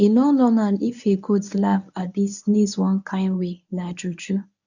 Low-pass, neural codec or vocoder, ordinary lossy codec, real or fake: 7.2 kHz; codec, 24 kHz, 0.9 kbps, WavTokenizer, medium speech release version 1; none; fake